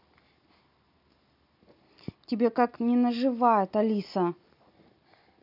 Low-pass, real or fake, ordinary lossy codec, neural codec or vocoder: 5.4 kHz; real; none; none